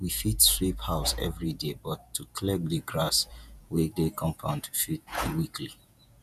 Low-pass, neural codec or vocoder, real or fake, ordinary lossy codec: 14.4 kHz; vocoder, 48 kHz, 128 mel bands, Vocos; fake; none